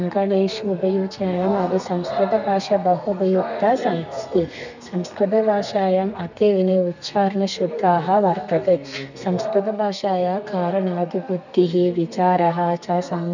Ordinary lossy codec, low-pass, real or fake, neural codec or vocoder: none; 7.2 kHz; fake; codec, 32 kHz, 1.9 kbps, SNAC